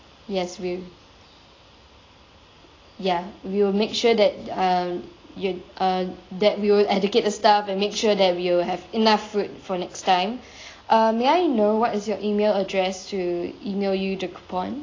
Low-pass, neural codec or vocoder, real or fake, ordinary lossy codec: 7.2 kHz; none; real; AAC, 32 kbps